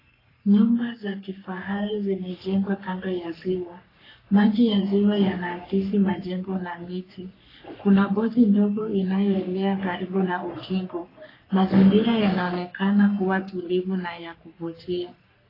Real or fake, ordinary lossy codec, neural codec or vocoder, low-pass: fake; AAC, 24 kbps; codec, 44.1 kHz, 3.4 kbps, Pupu-Codec; 5.4 kHz